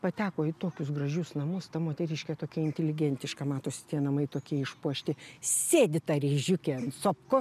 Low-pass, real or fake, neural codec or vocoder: 14.4 kHz; real; none